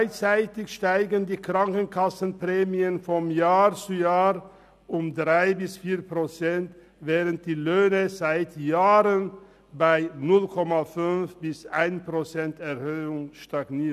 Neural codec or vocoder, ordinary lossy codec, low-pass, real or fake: none; none; 14.4 kHz; real